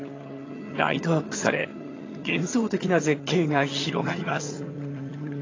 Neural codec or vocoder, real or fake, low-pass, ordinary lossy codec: vocoder, 22.05 kHz, 80 mel bands, HiFi-GAN; fake; 7.2 kHz; AAC, 32 kbps